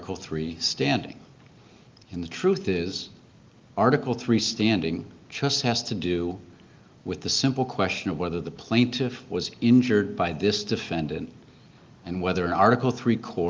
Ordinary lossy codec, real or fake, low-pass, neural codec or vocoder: Opus, 32 kbps; real; 7.2 kHz; none